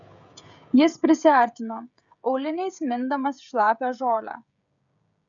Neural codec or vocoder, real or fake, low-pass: codec, 16 kHz, 16 kbps, FreqCodec, smaller model; fake; 7.2 kHz